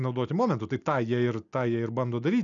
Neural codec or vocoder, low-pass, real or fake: none; 7.2 kHz; real